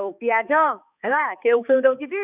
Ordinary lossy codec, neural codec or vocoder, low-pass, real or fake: none; codec, 16 kHz, 4 kbps, X-Codec, HuBERT features, trained on balanced general audio; 3.6 kHz; fake